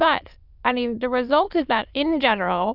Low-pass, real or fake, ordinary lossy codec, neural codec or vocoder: 5.4 kHz; fake; Opus, 64 kbps; autoencoder, 22.05 kHz, a latent of 192 numbers a frame, VITS, trained on many speakers